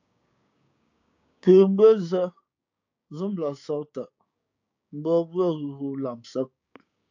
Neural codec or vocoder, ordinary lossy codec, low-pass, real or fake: codec, 16 kHz, 6 kbps, DAC; AAC, 48 kbps; 7.2 kHz; fake